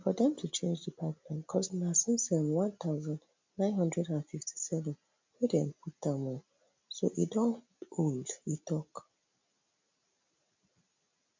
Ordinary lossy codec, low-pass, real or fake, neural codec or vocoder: MP3, 64 kbps; 7.2 kHz; real; none